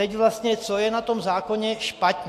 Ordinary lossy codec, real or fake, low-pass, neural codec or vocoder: AAC, 64 kbps; real; 14.4 kHz; none